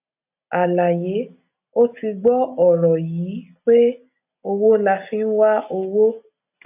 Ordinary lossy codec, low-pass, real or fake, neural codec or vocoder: none; 3.6 kHz; real; none